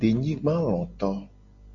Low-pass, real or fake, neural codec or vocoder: 7.2 kHz; real; none